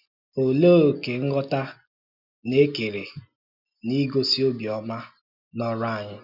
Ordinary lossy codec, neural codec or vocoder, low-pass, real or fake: none; none; 5.4 kHz; real